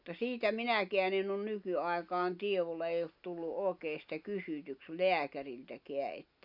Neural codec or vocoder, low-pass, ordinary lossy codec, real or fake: none; 5.4 kHz; none; real